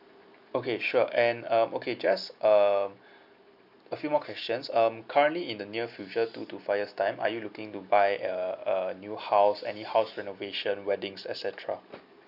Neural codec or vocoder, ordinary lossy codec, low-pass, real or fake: none; none; 5.4 kHz; real